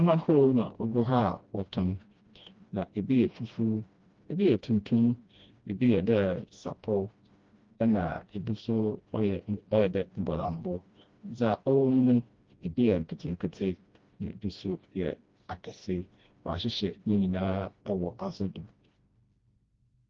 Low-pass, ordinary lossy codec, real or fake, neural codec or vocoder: 7.2 kHz; Opus, 32 kbps; fake; codec, 16 kHz, 1 kbps, FreqCodec, smaller model